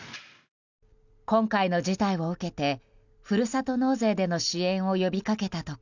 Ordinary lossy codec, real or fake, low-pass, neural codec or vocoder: none; real; 7.2 kHz; none